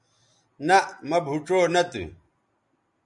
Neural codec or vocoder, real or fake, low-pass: none; real; 10.8 kHz